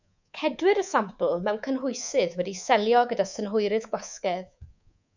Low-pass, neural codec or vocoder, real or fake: 7.2 kHz; codec, 24 kHz, 3.1 kbps, DualCodec; fake